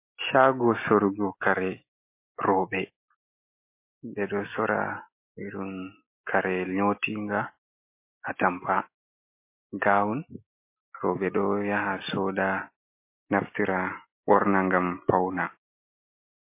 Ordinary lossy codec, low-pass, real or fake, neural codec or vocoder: MP3, 24 kbps; 3.6 kHz; real; none